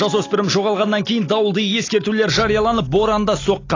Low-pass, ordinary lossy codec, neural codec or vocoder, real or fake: 7.2 kHz; AAC, 32 kbps; none; real